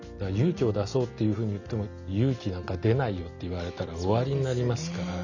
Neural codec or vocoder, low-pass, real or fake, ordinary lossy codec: none; 7.2 kHz; real; none